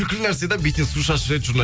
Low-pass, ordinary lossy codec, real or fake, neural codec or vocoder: none; none; real; none